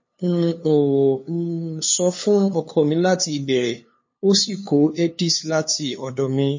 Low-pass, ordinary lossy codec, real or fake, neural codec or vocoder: 7.2 kHz; MP3, 32 kbps; fake; codec, 16 kHz, 2 kbps, FunCodec, trained on LibriTTS, 25 frames a second